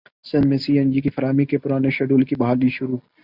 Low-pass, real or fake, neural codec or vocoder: 5.4 kHz; real; none